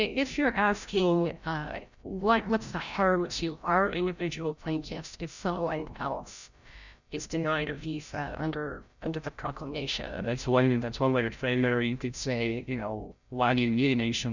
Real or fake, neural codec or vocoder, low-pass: fake; codec, 16 kHz, 0.5 kbps, FreqCodec, larger model; 7.2 kHz